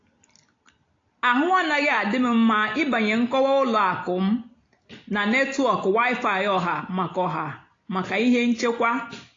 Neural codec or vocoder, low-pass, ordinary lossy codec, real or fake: none; 7.2 kHz; AAC, 32 kbps; real